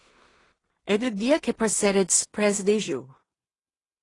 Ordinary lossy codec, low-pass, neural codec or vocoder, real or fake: AAC, 32 kbps; 10.8 kHz; codec, 16 kHz in and 24 kHz out, 0.4 kbps, LongCat-Audio-Codec, two codebook decoder; fake